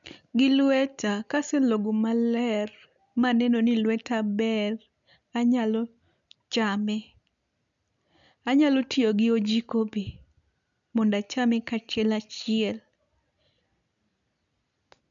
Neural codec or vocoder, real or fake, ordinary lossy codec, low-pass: none; real; none; 7.2 kHz